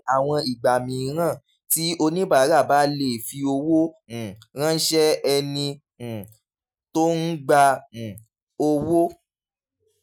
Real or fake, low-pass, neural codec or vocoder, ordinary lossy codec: real; none; none; none